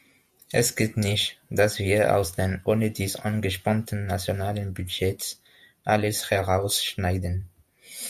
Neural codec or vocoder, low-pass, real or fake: vocoder, 48 kHz, 128 mel bands, Vocos; 14.4 kHz; fake